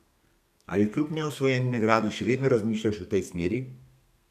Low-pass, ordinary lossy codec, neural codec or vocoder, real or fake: 14.4 kHz; none; codec, 32 kHz, 1.9 kbps, SNAC; fake